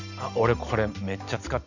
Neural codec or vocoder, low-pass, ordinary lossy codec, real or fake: none; 7.2 kHz; none; real